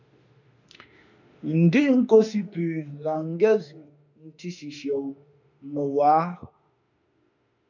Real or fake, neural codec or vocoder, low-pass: fake; autoencoder, 48 kHz, 32 numbers a frame, DAC-VAE, trained on Japanese speech; 7.2 kHz